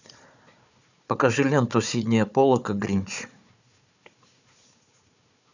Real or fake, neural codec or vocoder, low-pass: fake; codec, 16 kHz, 4 kbps, FunCodec, trained on Chinese and English, 50 frames a second; 7.2 kHz